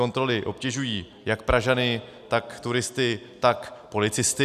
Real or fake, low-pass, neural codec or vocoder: real; 14.4 kHz; none